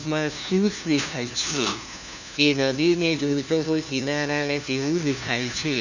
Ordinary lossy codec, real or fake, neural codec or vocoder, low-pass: none; fake; codec, 16 kHz, 1 kbps, FunCodec, trained on LibriTTS, 50 frames a second; 7.2 kHz